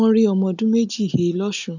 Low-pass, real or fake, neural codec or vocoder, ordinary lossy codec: 7.2 kHz; real; none; none